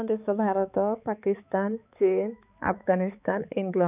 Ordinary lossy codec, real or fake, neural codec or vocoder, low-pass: none; fake; codec, 16 kHz, 4 kbps, X-Codec, HuBERT features, trained on balanced general audio; 3.6 kHz